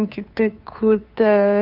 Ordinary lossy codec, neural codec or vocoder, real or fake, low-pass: none; codec, 16 kHz, 2 kbps, FunCodec, trained on Chinese and English, 25 frames a second; fake; 5.4 kHz